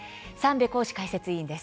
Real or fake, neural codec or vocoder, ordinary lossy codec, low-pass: real; none; none; none